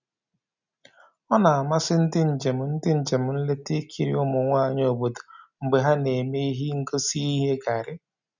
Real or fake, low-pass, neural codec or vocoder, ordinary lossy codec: real; 7.2 kHz; none; none